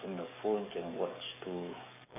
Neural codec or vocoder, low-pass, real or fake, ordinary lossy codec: codec, 44.1 kHz, 7.8 kbps, DAC; 3.6 kHz; fake; none